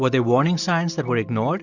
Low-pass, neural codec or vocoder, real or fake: 7.2 kHz; none; real